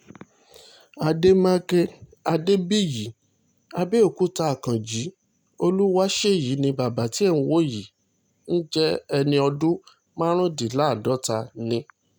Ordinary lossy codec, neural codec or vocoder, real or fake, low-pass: none; none; real; none